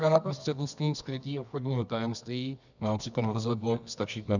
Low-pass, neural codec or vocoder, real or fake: 7.2 kHz; codec, 24 kHz, 0.9 kbps, WavTokenizer, medium music audio release; fake